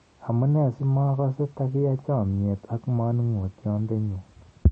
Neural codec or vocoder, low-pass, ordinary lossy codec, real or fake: none; 9.9 kHz; MP3, 32 kbps; real